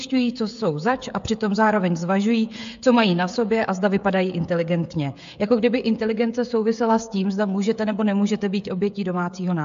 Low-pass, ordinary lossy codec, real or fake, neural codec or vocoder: 7.2 kHz; AAC, 64 kbps; fake; codec, 16 kHz, 16 kbps, FreqCodec, smaller model